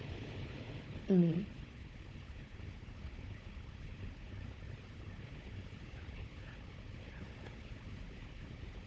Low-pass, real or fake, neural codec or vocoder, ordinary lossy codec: none; fake; codec, 16 kHz, 4 kbps, FunCodec, trained on Chinese and English, 50 frames a second; none